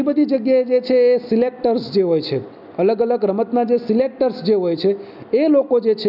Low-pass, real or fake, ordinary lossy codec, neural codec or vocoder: 5.4 kHz; real; none; none